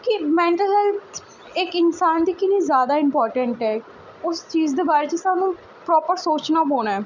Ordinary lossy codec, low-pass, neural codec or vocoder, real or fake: none; 7.2 kHz; none; real